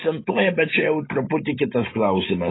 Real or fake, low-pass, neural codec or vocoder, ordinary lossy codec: real; 7.2 kHz; none; AAC, 16 kbps